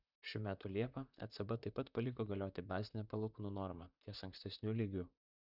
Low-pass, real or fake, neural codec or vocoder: 5.4 kHz; fake; vocoder, 44.1 kHz, 128 mel bands, Pupu-Vocoder